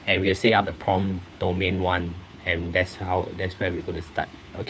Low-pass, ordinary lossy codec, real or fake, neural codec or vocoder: none; none; fake; codec, 16 kHz, 4 kbps, FunCodec, trained on LibriTTS, 50 frames a second